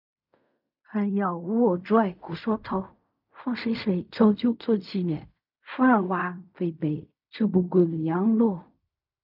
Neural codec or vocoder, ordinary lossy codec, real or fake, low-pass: codec, 16 kHz in and 24 kHz out, 0.4 kbps, LongCat-Audio-Codec, fine tuned four codebook decoder; none; fake; 5.4 kHz